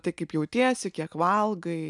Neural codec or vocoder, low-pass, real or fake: none; 10.8 kHz; real